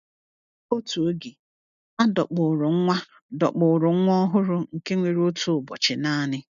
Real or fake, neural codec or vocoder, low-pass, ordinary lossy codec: real; none; 7.2 kHz; none